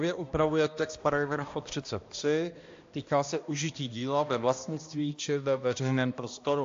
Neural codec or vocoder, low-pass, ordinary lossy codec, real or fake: codec, 16 kHz, 1 kbps, X-Codec, HuBERT features, trained on balanced general audio; 7.2 kHz; AAC, 48 kbps; fake